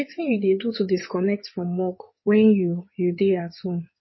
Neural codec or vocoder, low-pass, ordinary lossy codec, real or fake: codec, 16 kHz in and 24 kHz out, 2.2 kbps, FireRedTTS-2 codec; 7.2 kHz; MP3, 24 kbps; fake